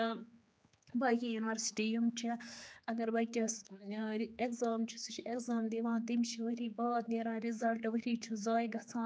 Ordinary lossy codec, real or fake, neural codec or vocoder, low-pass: none; fake; codec, 16 kHz, 4 kbps, X-Codec, HuBERT features, trained on general audio; none